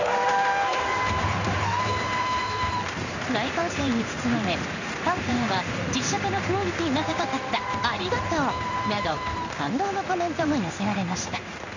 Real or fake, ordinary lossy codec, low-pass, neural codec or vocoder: fake; none; 7.2 kHz; codec, 16 kHz in and 24 kHz out, 1 kbps, XY-Tokenizer